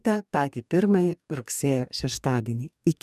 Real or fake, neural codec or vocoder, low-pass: fake; codec, 44.1 kHz, 2.6 kbps, DAC; 14.4 kHz